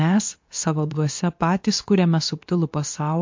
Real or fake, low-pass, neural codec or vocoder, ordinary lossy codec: fake; 7.2 kHz; codec, 24 kHz, 0.9 kbps, WavTokenizer, medium speech release version 2; MP3, 48 kbps